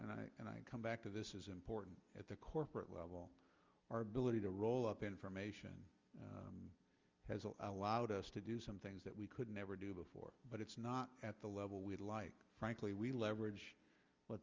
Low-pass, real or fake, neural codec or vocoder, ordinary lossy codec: 7.2 kHz; real; none; Opus, 32 kbps